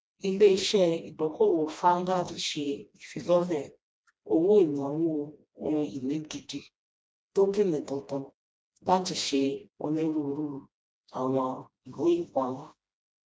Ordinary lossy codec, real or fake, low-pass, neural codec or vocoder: none; fake; none; codec, 16 kHz, 1 kbps, FreqCodec, smaller model